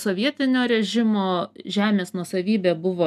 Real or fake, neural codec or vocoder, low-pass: real; none; 14.4 kHz